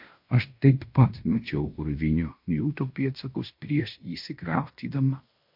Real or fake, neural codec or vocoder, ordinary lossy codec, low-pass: fake; codec, 16 kHz in and 24 kHz out, 0.9 kbps, LongCat-Audio-Codec, fine tuned four codebook decoder; MP3, 48 kbps; 5.4 kHz